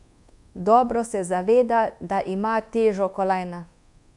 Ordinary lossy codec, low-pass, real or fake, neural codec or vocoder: none; 10.8 kHz; fake; codec, 24 kHz, 1.2 kbps, DualCodec